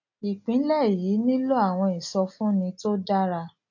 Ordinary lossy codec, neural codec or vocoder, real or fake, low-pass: none; none; real; 7.2 kHz